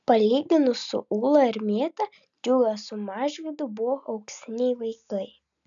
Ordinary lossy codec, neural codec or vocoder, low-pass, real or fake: MP3, 96 kbps; none; 7.2 kHz; real